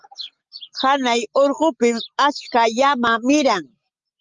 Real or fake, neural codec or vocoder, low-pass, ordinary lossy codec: real; none; 7.2 kHz; Opus, 32 kbps